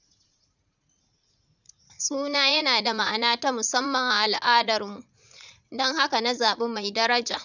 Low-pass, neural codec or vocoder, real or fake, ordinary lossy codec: 7.2 kHz; vocoder, 44.1 kHz, 80 mel bands, Vocos; fake; none